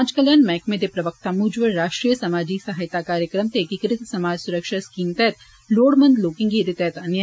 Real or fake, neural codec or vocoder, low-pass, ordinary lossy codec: real; none; none; none